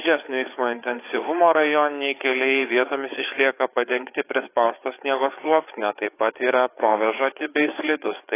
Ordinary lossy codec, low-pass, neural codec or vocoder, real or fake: AAC, 24 kbps; 3.6 kHz; codec, 16 kHz, 4 kbps, FreqCodec, larger model; fake